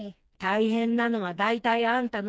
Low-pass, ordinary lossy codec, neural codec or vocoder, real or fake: none; none; codec, 16 kHz, 2 kbps, FreqCodec, smaller model; fake